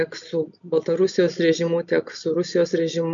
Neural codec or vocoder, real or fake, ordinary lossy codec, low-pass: none; real; MP3, 64 kbps; 7.2 kHz